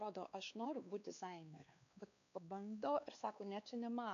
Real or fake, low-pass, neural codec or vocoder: fake; 7.2 kHz; codec, 16 kHz, 2 kbps, X-Codec, WavLM features, trained on Multilingual LibriSpeech